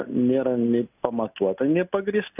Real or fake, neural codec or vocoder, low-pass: real; none; 3.6 kHz